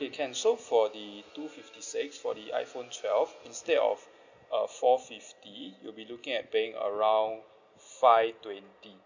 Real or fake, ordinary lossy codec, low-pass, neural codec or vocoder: real; none; 7.2 kHz; none